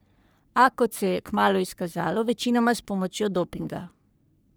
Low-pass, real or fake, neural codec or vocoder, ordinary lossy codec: none; fake; codec, 44.1 kHz, 3.4 kbps, Pupu-Codec; none